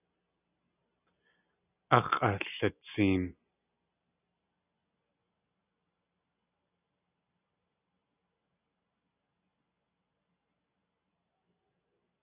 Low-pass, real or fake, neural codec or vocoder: 3.6 kHz; real; none